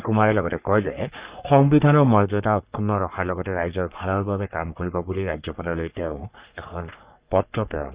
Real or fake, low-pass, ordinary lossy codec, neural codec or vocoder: fake; 3.6 kHz; Opus, 64 kbps; codec, 44.1 kHz, 3.4 kbps, Pupu-Codec